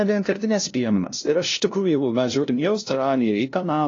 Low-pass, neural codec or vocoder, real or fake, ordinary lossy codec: 7.2 kHz; codec, 16 kHz, 0.5 kbps, FunCodec, trained on LibriTTS, 25 frames a second; fake; AAC, 32 kbps